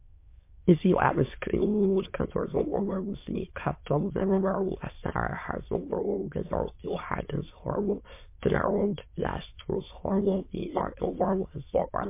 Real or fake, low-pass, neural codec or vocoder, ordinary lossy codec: fake; 3.6 kHz; autoencoder, 22.05 kHz, a latent of 192 numbers a frame, VITS, trained on many speakers; MP3, 24 kbps